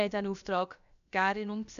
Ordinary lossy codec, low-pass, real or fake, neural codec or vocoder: none; 7.2 kHz; fake; codec, 16 kHz, about 1 kbps, DyCAST, with the encoder's durations